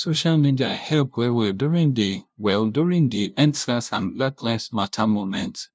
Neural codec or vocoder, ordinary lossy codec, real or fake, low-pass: codec, 16 kHz, 0.5 kbps, FunCodec, trained on LibriTTS, 25 frames a second; none; fake; none